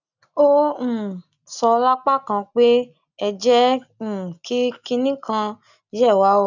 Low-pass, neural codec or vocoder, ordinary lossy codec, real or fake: 7.2 kHz; none; none; real